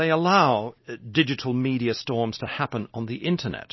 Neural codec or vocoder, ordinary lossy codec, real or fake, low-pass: none; MP3, 24 kbps; real; 7.2 kHz